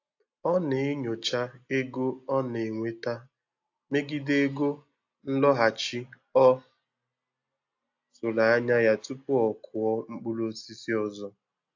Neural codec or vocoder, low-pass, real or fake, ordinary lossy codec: none; 7.2 kHz; real; AAC, 48 kbps